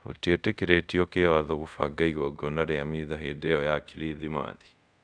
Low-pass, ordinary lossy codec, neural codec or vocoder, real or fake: 9.9 kHz; none; codec, 24 kHz, 0.5 kbps, DualCodec; fake